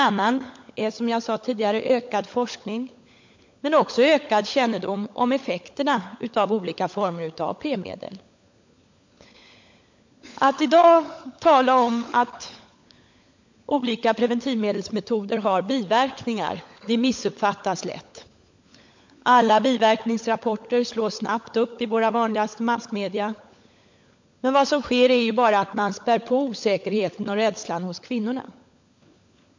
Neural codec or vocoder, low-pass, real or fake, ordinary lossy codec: codec, 16 kHz, 16 kbps, FunCodec, trained on LibriTTS, 50 frames a second; 7.2 kHz; fake; MP3, 48 kbps